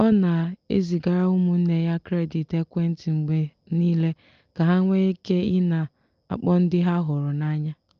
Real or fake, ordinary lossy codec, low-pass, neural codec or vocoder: real; Opus, 24 kbps; 7.2 kHz; none